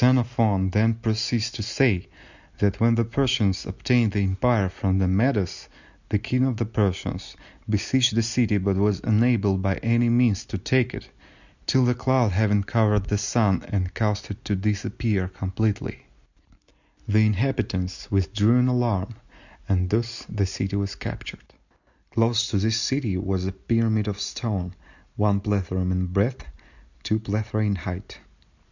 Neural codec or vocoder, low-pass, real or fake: none; 7.2 kHz; real